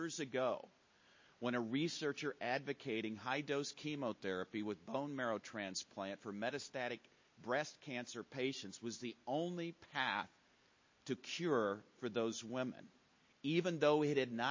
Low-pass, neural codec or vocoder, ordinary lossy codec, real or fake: 7.2 kHz; none; MP3, 32 kbps; real